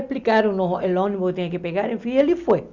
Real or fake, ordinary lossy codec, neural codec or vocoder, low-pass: real; Opus, 64 kbps; none; 7.2 kHz